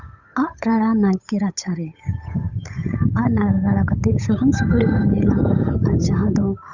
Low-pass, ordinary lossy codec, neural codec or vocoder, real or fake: 7.2 kHz; none; codec, 16 kHz, 8 kbps, FunCodec, trained on Chinese and English, 25 frames a second; fake